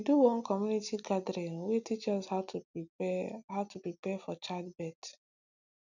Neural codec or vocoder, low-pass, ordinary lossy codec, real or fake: none; 7.2 kHz; none; real